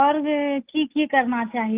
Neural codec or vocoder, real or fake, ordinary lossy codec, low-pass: none; real; Opus, 32 kbps; 3.6 kHz